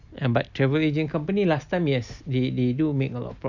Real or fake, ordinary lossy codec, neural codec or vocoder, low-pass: real; none; none; 7.2 kHz